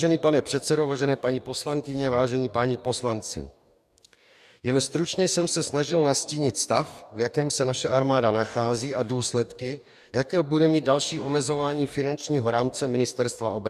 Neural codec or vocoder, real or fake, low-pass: codec, 44.1 kHz, 2.6 kbps, DAC; fake; 14.4 kHz